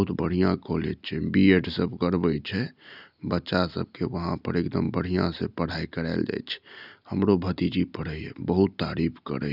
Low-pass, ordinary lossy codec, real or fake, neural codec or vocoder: 5.4 kHz; none; real; none